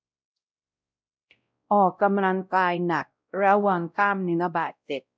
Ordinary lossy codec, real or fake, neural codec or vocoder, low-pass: none; fake; codec, 16 kHz, 0.5 kbps, X-Codec, WavLM features, trained on Multilingual LibriSpeech; none